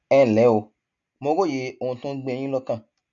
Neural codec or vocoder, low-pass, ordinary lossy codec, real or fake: none; 7.2 kHz; none; real